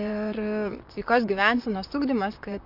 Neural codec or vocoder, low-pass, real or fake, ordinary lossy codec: vocoder, 44.1 kHz, 128 mel bands, Pupu-Vocoder; 5.4 kHz; fake; MP3, 48 kbps